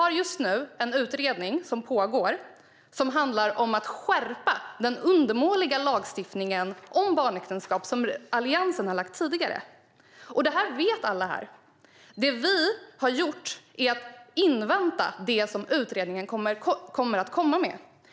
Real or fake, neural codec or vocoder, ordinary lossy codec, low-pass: real; none; none; none